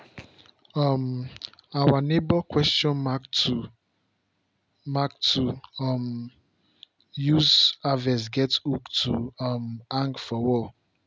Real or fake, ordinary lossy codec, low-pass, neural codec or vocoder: real; none; none; none